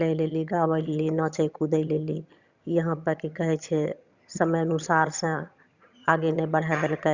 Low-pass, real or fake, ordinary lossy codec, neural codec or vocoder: 7.2 kHz; fake; Opus, 64 kbps; vocoder, 22.05 kHz, 80 mel bands, HiFi-GAN